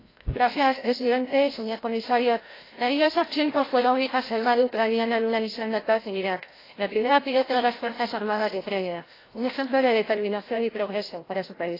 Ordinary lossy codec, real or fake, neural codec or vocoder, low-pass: AAC, 24 kbps; fake; codec, 16 kHz, 0.5 kbps, FreqCodec, larger model; 5.4 kHz